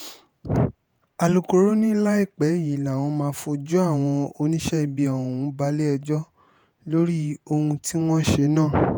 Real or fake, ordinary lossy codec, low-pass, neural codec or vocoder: fake; none; none; vocoder, 48 kHz, 128 mel bands, Vocos